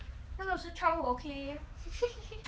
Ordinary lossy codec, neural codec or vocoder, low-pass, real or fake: none; codec, 16 kHz, 4 kbps, X-Codec, HuBERT features, trained on balanced general audio; none; fake